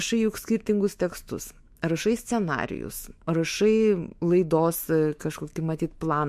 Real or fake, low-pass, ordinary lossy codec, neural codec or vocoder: fake; 14.4 kHz; MP3, 64 kbps; autoencoder, 48 kHz, 128 numbers a frame, DAC-VAE, trained on Japanese speech